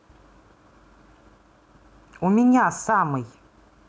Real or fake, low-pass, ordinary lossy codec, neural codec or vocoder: real; none; none; none